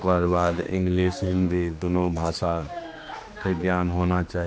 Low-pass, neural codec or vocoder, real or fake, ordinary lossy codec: none; codec, 16 kHz, 2 kbps, X-Codec, HuBERT features, trained on general audio; fake; none